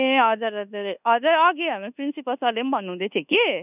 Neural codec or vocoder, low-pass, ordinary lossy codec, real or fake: codec, 24 kHz, 1.2 kbps, DualCodec; 3.6 kHz; none; fake